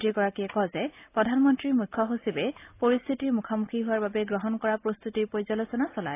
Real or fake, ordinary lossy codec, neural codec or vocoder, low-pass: real; AAC, 24 kbps; none; 3.6 kHz